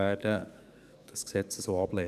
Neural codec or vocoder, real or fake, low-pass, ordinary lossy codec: codec, 44.1 kHz, 7.8 kbps, DAC; fake; 14.4 kHz; none